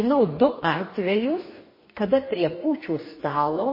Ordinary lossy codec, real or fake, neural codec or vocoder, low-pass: MP3, 24 kbps; fake; codec, 44.1 kHz, 2.6 kbps, DAC; 5.4 kHz